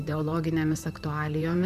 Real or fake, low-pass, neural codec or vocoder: fake; 14.4 kHz; vocoder, 44.1 kHz, 128 mel bands, Pupu-Vocoder